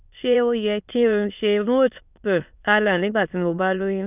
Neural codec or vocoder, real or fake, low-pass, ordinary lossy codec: autoencoder, 22.05 kHz, a latent of 192 numbers a frame, VITS, trained on many speakers; fake; 3.6 kHz; none